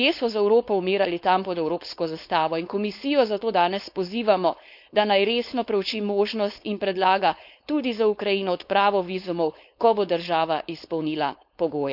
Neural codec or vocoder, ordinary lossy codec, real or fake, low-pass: codec, 16 kHz, 4.8 kbps, FACodec; none; fake; 5.4 kHz